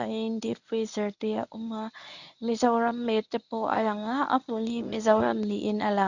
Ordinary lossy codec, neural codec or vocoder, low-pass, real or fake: none; codec, 24 kHz, 0.9 kbps, WavTokenizer, medium speech release version 1; 7.2 kHz; fake